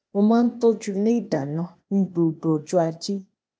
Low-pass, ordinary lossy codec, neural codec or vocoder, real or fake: none; none; codec, 16 kHz, 0.8 kbps, ZipCodec; fake